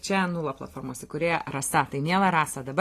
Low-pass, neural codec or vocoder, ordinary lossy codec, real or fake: 14.4 kHz; none; AAC, 48 kbps; real